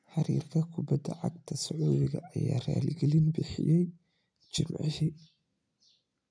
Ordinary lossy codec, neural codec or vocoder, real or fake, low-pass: none; none; real; 9.9 kHz